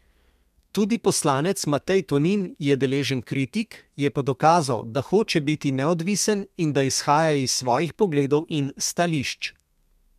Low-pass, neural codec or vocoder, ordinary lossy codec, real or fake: 14.4 kHz; codec, 32 kHz, 1.9 kbps, SNAC; MP3, 96 kbps; fake